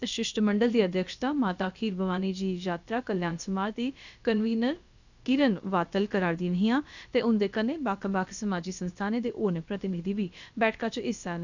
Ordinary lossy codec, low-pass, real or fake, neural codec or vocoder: none; 7.2 kHz; fake; codec, 16 kHz, about 1 kbps, DyCAST, with the encoder's durations